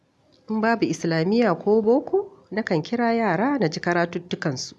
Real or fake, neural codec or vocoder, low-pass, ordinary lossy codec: real; none; none; none